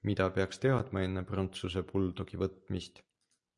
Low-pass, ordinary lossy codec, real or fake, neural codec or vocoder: 10.8 kHz; MP3, 48 kbps; real; none